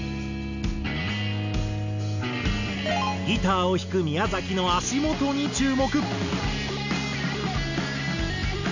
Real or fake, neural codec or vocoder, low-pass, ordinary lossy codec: real; none; 7.2 kHz; none